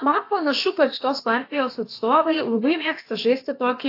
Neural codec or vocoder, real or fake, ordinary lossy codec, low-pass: codec, 16 kHz, about 1 kbps, DyCAST, with the encoder's durations; fake; AAC, 32 kbps; 5.4 kHz